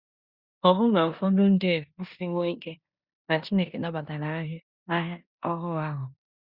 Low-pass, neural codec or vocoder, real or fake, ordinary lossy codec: 5.4 kHz; codec, 16 kHz in and 24 kHz out, 0.9 kbps, LongCat-Audio-Codec, four codebook decoder; fake; Opus, 64 kbps